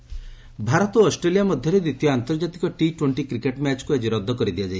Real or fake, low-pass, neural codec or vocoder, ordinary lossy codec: real; none; none; none